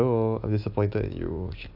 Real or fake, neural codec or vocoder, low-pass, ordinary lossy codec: real; none; 5.4 kHz; AAC, 48 kbps